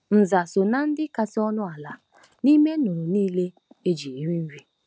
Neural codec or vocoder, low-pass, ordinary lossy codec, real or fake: none; none; none; real